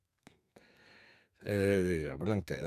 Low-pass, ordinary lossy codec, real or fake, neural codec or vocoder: 14.4 kHz; none; fake; codec, 32 kHz, 1.9 kbps, SNAC